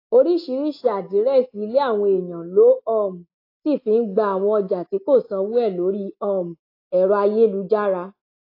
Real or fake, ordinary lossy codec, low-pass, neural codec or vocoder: real; AAC, 32 kbps; 5.4 kHz; none